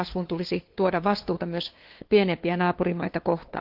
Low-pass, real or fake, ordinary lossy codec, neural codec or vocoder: 5.4 kHz; fake; Opus, 16 kbps; codec, 16 kHz, 2 kbps, FunCodec, trained on LibriTTS, 25 frames a second